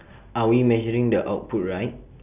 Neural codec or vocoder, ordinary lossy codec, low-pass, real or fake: none; none; 3.6 kHz; real